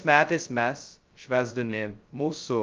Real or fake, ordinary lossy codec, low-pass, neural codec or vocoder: fake; Opus, 24 kbps; 7.2 kHz; codec, 16 kHz, 0.2 kbps, FocalCodec